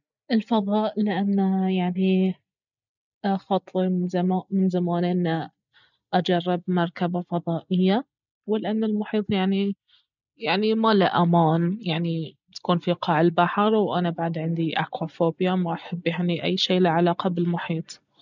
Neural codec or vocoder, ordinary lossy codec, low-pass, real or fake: none; none; 7.2 kHz; real